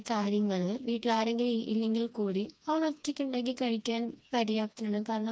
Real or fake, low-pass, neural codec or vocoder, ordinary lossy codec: fake; none; codec, 16 kHz, 2 kbps, FreqCodec, smaller model; none